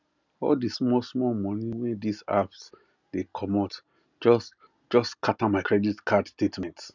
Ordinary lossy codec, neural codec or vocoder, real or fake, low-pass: none; none; real; 7.2 kHz